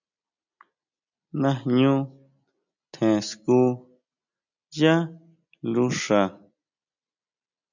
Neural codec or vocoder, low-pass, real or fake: none; 7.2 kHz; real